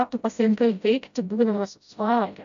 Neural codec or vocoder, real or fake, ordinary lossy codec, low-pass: codec, 16 kHz, 0.5 kbps, FreqCodec, smaller model; fake; AAC, 96 kbps; 7.2 kHz